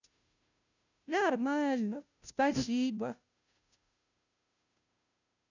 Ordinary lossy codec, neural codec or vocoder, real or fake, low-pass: none; codec, 16 kHz, 0.5 kbps, FunCodec, trained on Chinese and English, 25 frames a second; fake; 7.2 kHz